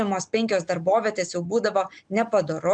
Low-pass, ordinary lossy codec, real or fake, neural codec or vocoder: 9.9 kHz; MP3, 96 kbps; real; none